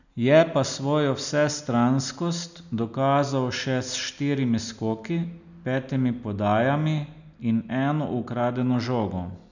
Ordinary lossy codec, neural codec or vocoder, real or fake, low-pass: none; none; real; 7.2 kHz